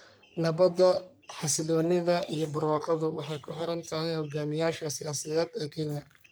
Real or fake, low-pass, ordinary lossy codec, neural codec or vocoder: fake; none; none; codec, 44.1 kHz, 3.4 kbps, Pupu-Codec